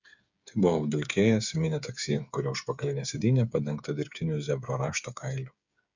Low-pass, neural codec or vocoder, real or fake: 7.2 kHz; codec, 16 kHz, 8 kbps, FreqCodec, smaller model; fake